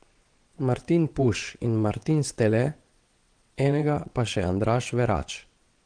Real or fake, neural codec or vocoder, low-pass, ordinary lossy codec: fake; vocoder, 22.05 kHz, 80 mel bands, WaveNeXt; 9.9 kHz; Opus, 24 kbps